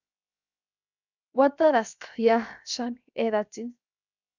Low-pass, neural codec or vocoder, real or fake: 7.2 kHz; codec, 16 kHz, 0.7 kbps, FocalCodec; fake